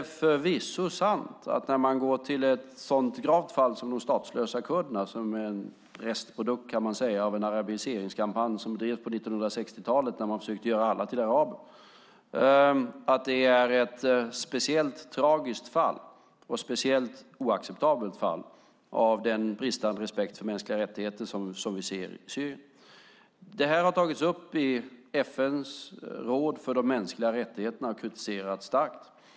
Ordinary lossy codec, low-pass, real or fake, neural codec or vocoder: none; none; real; none